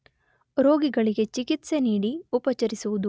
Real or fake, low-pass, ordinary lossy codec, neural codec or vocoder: real; none; none; none